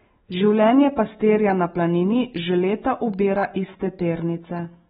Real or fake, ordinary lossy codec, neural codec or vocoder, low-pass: real; AAC, 16 kbps; none; 7.2 kHz